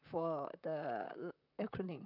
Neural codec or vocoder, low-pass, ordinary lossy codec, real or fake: vocoder, 44.1 kHz, 128 mel bands, Pupu-Vocoder; 5.4 kHz; none; fake